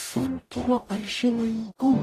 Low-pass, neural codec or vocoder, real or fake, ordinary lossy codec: 14.4 kHz; codec, 44.1 kHz, 0.9 kbps, DAC; fake; AAC, 48 kbps